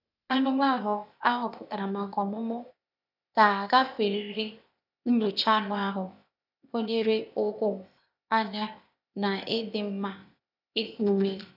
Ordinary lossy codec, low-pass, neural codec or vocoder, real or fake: none; 5.4 kHz; codec, 16 kHz, 0.8 kbps, ZipCodec; fake